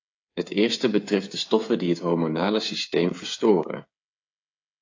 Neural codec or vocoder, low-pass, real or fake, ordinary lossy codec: codec, 16 kHz, 8 kbps, FreqCodec, smaller model; 7.2 kHz; fake; AAC, 48 kbps